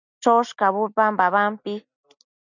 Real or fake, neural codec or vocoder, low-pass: real; none; 7.2 kHz